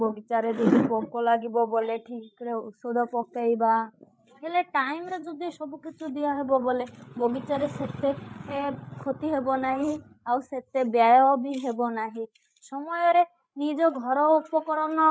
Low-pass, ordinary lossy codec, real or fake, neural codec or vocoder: none; none; fake; codec, 16 kHz, 8 kbps, FreqCodec, larger model